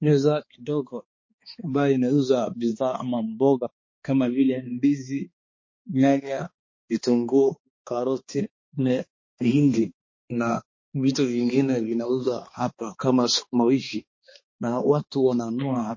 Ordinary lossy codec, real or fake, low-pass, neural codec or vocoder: MP3, 32 kbps; fake; 7.2 kHz; codec, 16 kHz, 2 kbps, X-Codec, HuBERT features, trained on balanced general audio